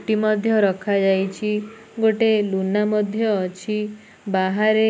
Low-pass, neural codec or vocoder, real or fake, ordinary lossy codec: none; none; real; none